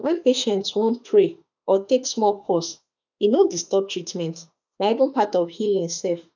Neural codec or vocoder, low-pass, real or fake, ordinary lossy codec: autoencoder, 48 kHz, 32 numbers a frame, DAC-VAE, trained on Japanese speech; 7.2 kHz; fake; none